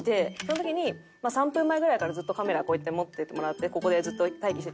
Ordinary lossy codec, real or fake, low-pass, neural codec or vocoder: none; real; none; none